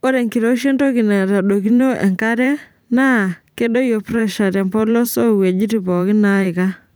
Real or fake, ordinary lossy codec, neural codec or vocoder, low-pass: fake; none; vocoder, 44.1 kHz, 128 mel bands every 512 samples, BigVGAN v2; none